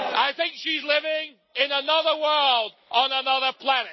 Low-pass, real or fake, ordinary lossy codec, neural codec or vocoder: 7.2 kHz; real; MP3, 24 kbps; none